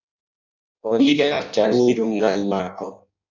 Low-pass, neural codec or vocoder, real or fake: 7.2 kHz; codec, 16 kHz in and 24 kHz out, 0.6 kbps, FireRedTTS-2 codec; fake